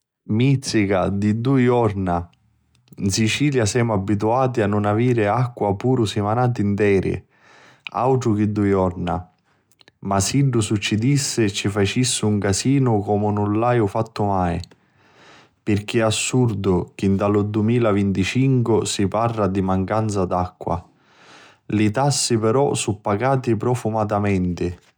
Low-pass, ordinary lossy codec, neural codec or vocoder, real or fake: none; none; none; real